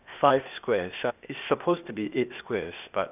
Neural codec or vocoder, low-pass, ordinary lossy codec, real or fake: codec, 16 kHz, 0.8 kbps, ZipCodec; 3.6 kHz; none; fake